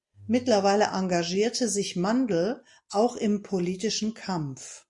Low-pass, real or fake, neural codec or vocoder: 10.8 kHz; real; none